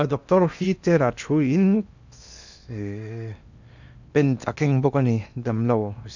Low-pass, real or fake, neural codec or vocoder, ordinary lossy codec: 7.2 kHz; fake; codec, 16 kHz in and 24 kHz out, 0.8 kbps, FocalCodec, streaming, 65536 codes; none